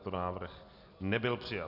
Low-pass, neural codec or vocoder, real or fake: 5.4 kHz; none; real